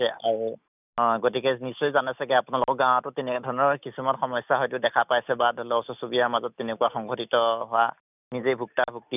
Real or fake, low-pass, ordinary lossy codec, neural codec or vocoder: real; 3.6 kHz; none; none